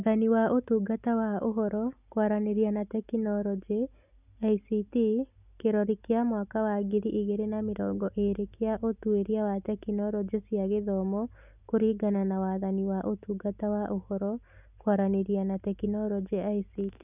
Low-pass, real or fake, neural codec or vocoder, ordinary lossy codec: 3.6 kHz; real; none; none